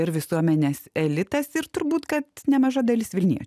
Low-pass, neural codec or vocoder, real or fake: 14.4 kHz; none; real